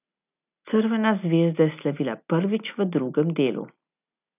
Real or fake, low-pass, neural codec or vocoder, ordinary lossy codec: real; 3.6 kHz; none; none